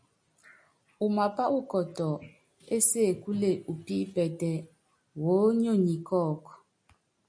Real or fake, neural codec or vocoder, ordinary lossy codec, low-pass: real; none; MP3, 96 kbps; 9.9 kHz